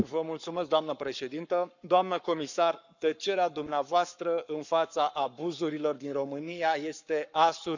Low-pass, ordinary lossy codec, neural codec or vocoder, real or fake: 7.2 kHz; none; codec, 44.1 kHz, 7.8 kbps, Pupu-Codec; fake